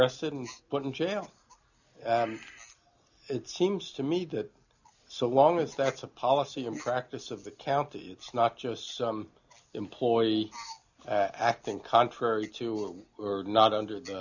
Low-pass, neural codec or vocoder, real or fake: 7.2 kHz; none; real